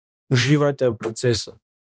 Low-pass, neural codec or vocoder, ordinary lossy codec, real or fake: none; codec, 16 kHz, 1 kbps, X-Codec, HuBERT features, trained on balanced general audio; none; fake